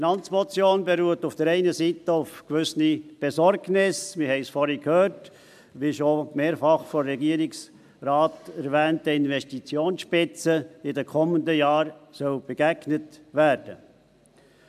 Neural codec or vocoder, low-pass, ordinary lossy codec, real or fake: none; 14.4 kHz; MP3, 96 kbps; real